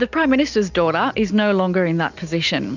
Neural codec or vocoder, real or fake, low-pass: none; real; 7.2 kHz